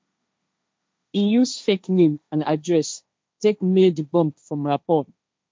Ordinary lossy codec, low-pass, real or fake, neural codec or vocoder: none; none; fake; codec, 16 kHz, 1.1 kbps, Voila-Tokenizer